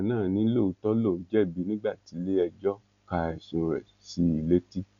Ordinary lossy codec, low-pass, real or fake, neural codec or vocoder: none; 7.2 kHz; real; none